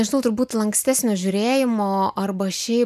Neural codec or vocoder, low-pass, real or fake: none; 14.4 kHz; real